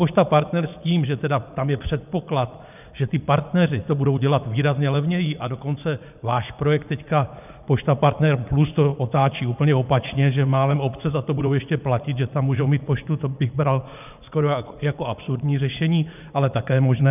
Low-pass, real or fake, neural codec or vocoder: 3.6 kHz; fake; vocoder, 44.1 kHz, 80 mel bands, Vocos